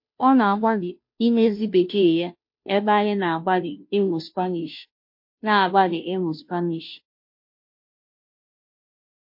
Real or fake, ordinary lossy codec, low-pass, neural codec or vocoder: fake; MP3, 32 kbps; 5.4 kHz; codec, 16 kHz, 0.5 kbps, FunCodec, trained on Chinese and English, 25 frames a second